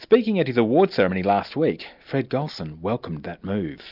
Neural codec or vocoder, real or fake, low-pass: none; real; 5.4 kHz